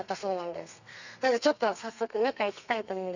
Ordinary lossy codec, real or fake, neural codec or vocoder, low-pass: none; fake; codec, 32 kHz, 1.9 kbps, SNAC; 7.2 kHz